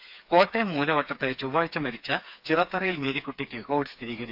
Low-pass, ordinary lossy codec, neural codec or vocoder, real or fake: 5.4 kHz; AAC, 48 kbps; codec, 16 kHz, 4 kbps, FreqCodec, smaller model; fake